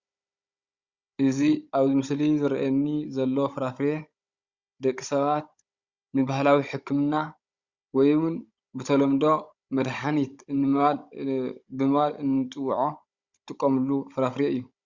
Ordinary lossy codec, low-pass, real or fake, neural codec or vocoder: Opus, 64 kbps; 7.2 kHz; fake; codec, 16 kHz, 16 kbps, FunCodec, trained on Chinese and English, 50 frames a second